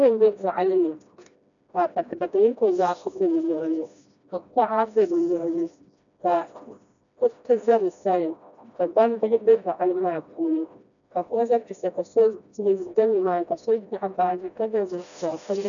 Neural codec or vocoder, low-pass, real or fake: codec, 16 kHz, 1 kbps, FreqCodec, smaller model; 7.2 kHz; fake